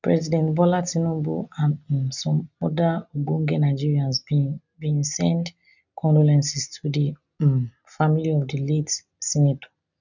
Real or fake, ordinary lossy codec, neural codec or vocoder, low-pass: real; none; none; 7.2 kHz